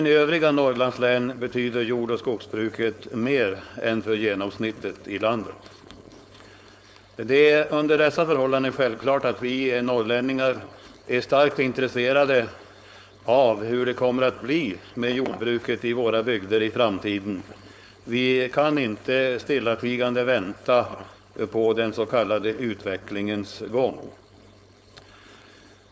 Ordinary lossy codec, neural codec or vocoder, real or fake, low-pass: none; codec, 16 kHz, 4.8 kbps, FACodec; fake; none